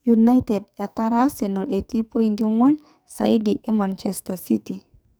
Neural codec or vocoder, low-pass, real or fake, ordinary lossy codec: codec, 44.1 kHz, 2.6 kbps, SNAC; none; fake; none